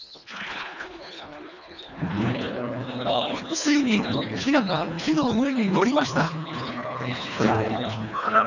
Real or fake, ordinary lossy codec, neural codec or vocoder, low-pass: fake; none; codec, 24 kHz, 1.5 kbps, HILCodec; 7.2 kHz